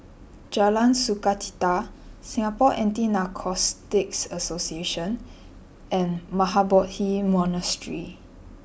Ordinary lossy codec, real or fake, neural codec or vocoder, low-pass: none; real; none; none